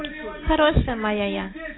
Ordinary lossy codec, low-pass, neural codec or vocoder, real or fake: AAC, 16 kbps; 7.2 kHz; none; real